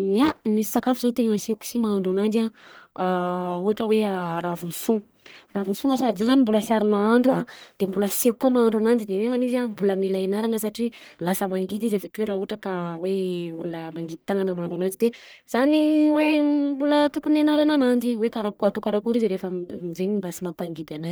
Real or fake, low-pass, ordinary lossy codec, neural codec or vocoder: fake; none; none; codec, 44.1 kHz, 1.7 kbps, Pupu-Codec